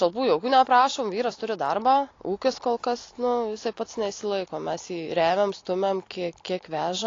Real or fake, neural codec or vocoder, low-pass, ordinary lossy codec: real; none; 7.2 kHz; AAC, 32 kbps